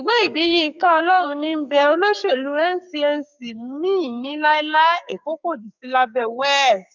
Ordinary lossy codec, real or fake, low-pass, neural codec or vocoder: none; fake; 7.2 kHz; codec, 44.1 kHz, 2.6 kbps, SNAC